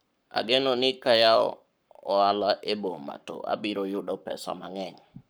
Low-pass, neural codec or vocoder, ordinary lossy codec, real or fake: none; codec, 44.1 kHz, 7.8 kbps, Pupu-Codec; none; fake